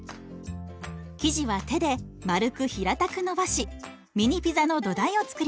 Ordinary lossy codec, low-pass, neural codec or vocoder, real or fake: none; none; none; real